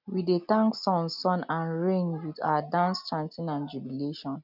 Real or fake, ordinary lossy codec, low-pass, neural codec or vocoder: real; none; 5.4 kHz; none